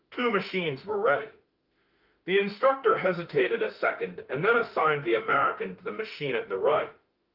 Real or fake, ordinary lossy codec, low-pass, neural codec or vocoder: fake; Opus, 24 kbps; 5.4 kHz; autoencoder, 48 kHz, 32 numbers a frame, DAC-VAE, trained on Japanese speech